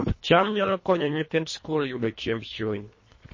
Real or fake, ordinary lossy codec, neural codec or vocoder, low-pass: fake; MP3, 32 kbps; codec, 24 kHz, 1.5 kbps, HILCodec; 7.2 kHz